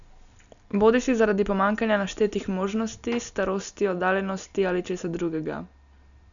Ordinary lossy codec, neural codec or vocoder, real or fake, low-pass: none; none; real; 7.2 kHz